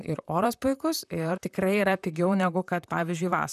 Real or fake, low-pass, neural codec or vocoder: fake; 14.4 kHz; vocoder, 44.1 kHz, 128 mel bands, Pupu-Vocoder